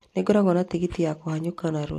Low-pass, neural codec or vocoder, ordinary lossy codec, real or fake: 14.4 kHz; none; AAC, 64 kbps; real